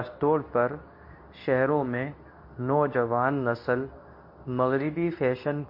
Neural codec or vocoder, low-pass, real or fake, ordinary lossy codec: codec, 24 kHz, 0.9 kbps, WavTokenizer, medium speech release version 2; 5.4 kHz; fake; MP3, 32 kbps